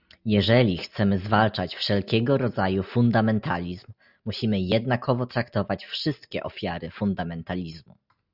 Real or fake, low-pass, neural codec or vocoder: real; 5.4 kHz; none